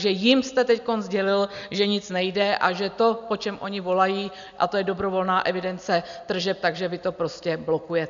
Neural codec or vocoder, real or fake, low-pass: none; real; 7.2 kHz